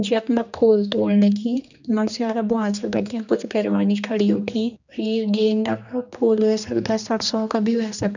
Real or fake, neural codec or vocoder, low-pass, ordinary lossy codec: fake; codec, 16 kHz, 2 kbps, X-Codec, HuBERT features, trained on general audio; 7.2 kHz; none